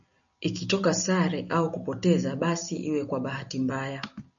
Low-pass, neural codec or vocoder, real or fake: 7.2 kHz; none; real